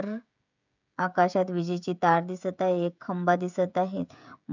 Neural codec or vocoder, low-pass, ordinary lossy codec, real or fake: codec, 16 kHz, 16 kbps, FreqCodec, smaller model; 7.2 kHz; none; fake